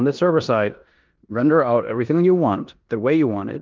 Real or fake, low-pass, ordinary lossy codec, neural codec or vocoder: fake; 7.2 kHz; Opus, 32 kbps; codec, 16 kHz in and 24 kHz out, 0.9 kbps, LongCat-Audio-Codec, four codebook decoder